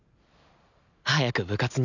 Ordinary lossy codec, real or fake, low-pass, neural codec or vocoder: none; real; 7.2 kHz; none